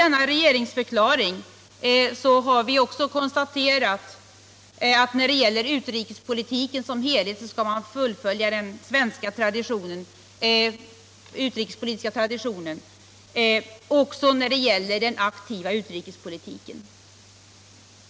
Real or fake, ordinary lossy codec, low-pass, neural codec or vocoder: real; none; none; none